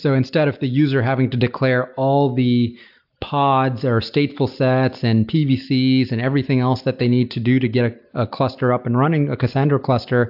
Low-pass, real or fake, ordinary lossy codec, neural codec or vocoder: 5.4 kHz; real; AAC, 48 kbps; none